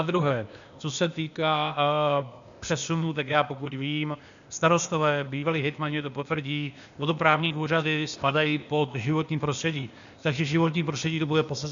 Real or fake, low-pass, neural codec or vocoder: fake; 7.2 kHz; codec, 16 kHz, 0.8 kbps, ZipCodec